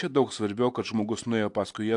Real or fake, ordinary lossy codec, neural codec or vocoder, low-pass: real; AAC, 64 kbps; none; 10.8 kHz